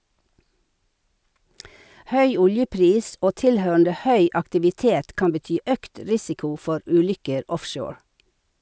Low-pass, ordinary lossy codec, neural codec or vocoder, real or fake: none; none; none; real